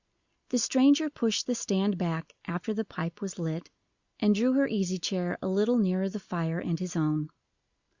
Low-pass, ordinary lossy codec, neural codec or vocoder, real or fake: 7.2 kHz; Opus, 64 kbps; none; real